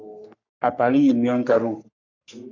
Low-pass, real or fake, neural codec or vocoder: 7.2 kHz; fake; codec, 44.1 kHz, 3.4 kbps, Pupu-Codec